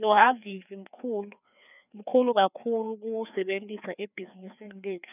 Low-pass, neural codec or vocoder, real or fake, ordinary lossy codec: 3.6 kHz; codec, 16 kHz, 2 kbps, FreqCodec, larger model; fake; none